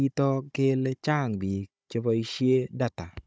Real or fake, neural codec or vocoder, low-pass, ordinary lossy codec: fake; codec, 16 kHz, 16 kbps, FunCodec, trained on Chinese and English, 50 frames a second; none; none